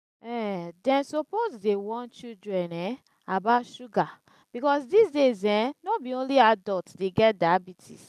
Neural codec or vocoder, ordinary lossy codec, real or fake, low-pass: none; none; real; 14.4 kHz